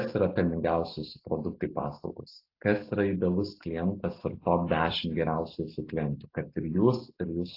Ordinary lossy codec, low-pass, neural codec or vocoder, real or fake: AAC, 32 kbps; 5.4 kHz; none; real